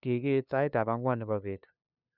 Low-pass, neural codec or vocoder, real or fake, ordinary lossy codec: 5.4 kHz; codec, 16 kHz, 2 kbps, FunCodec, trained on LibriTTS, 25 frames a second; fake; none